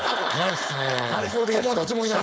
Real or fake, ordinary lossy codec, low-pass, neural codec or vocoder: fake; none; none; codec, 16 kHz, 4.8 kbps, FACodec